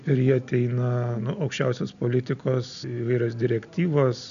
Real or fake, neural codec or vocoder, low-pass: real; none; 7.2 kHz